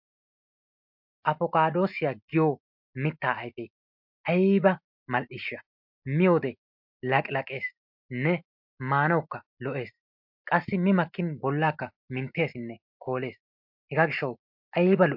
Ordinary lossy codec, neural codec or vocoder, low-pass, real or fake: MP3, 48 kbps; none; 5.4 kHz; real